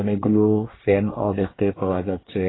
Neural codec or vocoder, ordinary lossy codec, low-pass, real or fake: codec, 24 kHz, 1 kbps, SNAC; AAC, 16 kbps; 7.2 kHz; fake